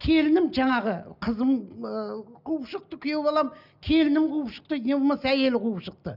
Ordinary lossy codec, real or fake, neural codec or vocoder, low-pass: none; real; none; 5.4 kHz